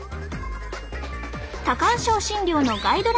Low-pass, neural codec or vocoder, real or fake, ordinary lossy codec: none; none; real; none